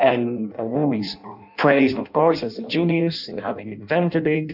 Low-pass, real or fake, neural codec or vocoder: 5.4 kHz; fake; codec, 16 kHz in and 24 kHz out, 0.6 kbps, FireRedTTS-2 codec